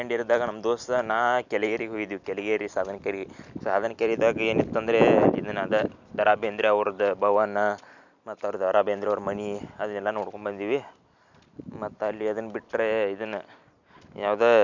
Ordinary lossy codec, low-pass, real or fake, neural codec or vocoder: none; 7.2 kHz; real; none